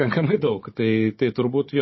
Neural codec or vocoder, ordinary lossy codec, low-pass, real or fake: codec, 16 kHz, 16 kbps, FunCodec, trained on Chinese and English, 50 frames a second; MP3, 24 kbps; 7.2 kHz; fake